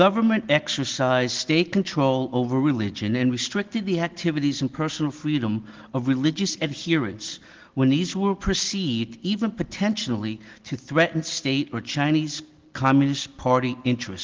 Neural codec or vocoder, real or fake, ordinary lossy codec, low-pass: none; real; Opus, 16 kbps; 7.2 kHz